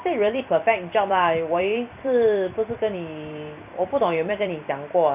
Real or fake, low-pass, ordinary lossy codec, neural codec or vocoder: fake; 3.6 kHz; none; vocoder, 44.1 kHz, 128 mel bands every 256 samples, BigVGAN v2